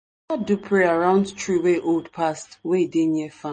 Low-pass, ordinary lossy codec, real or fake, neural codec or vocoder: 9.9 kHz; MP3, 32 kbps; real; none